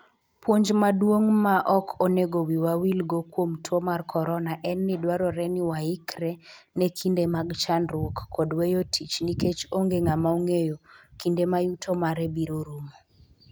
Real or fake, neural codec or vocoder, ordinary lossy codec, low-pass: real; none; none; none